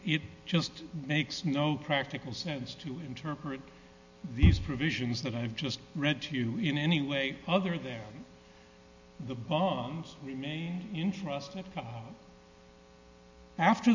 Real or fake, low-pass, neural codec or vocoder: real; 7.2 kHz; none